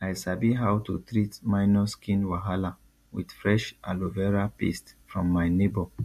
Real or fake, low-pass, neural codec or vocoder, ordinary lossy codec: real; 14.4 kHz; none; MP3, 64 kbps